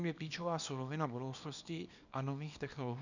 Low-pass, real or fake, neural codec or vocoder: 7.2 kHz; fake; codec, 24 kHz, 0.9 kbps, WavTokenizer, small release